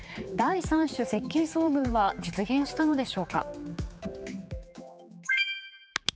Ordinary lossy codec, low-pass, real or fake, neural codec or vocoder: none; none; fake; codec, 16 kHz, 4 kbps, X-Codec, HuBERT features, trained on general audio